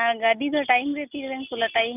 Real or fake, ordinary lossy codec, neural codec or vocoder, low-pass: real; none; none; 3.6 kHz